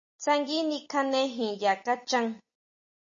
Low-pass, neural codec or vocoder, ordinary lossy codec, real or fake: 7.2 kHz; none; MP3, 32 kbps; real